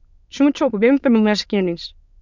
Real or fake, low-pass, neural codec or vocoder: fake; 7.2 kHz; autoencoder, 22.05 kHz, a latent of 192 numbers a frame, VITS, trained on many speakers